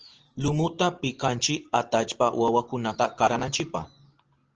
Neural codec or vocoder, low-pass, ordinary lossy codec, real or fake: none; 7.2 kHz; Opus, 16 kbps; real